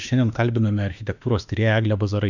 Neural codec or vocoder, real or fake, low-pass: autoencoder, 48 kHz, 32 numbers a frame, DAC-VAE, trained on Japanese speech; fake; 7.2 kHz